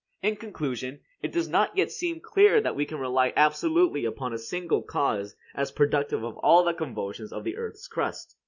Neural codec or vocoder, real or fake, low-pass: none; real; 7.2 kHz